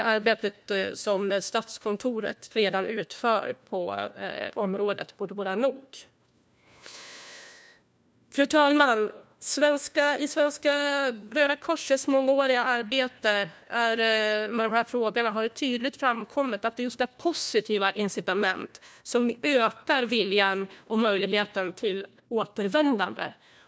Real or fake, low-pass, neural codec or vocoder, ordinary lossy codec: fake; none; codec, 16 kHz, 1 kbps, FunCodec, trained on LibriTTS, 50 frames a second; none